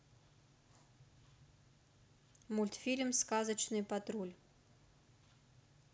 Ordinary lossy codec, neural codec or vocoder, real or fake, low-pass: none; none; real; none